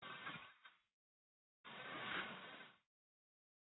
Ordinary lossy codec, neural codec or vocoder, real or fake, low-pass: AAC, 16 kbps; codec, 44.1 kHz, 1.7 kbps, Pupu-Codec; fake; 7.2 kHz